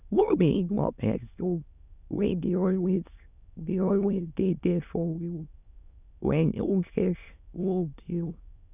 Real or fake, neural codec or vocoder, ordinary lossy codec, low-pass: fake; autoencoder, 22.05 kHz, a latent of 192 numbers a frame, VITS, trained on many speakers; none; 3.6 kHz